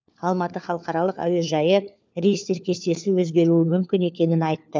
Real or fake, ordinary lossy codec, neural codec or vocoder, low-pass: fake; none; codec, 16 kHz, 4 kbps, FunCodec, trained on LibriTTS, 50 frames a second; none